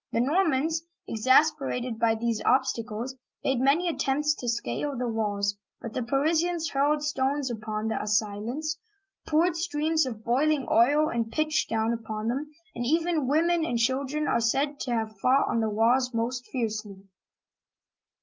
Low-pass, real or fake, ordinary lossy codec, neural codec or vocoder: 7.2 kHz; real; Opus, 24 kbps; none